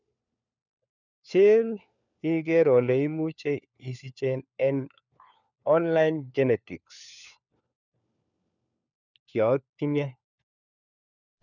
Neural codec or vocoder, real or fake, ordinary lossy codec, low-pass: codec, 16 kHz, 4 kbps, FunCodec, trained on LibriTTS, 50 frames a second; fake; none; 7.2 kHz